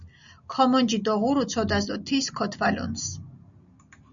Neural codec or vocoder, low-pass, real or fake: none; 7.2 kHz; real